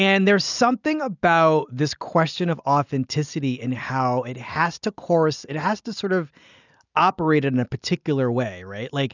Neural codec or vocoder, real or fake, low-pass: none; real; 7.2 kHz